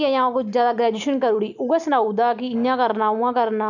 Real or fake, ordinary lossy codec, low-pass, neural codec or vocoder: real; none; 7.2 kHz; none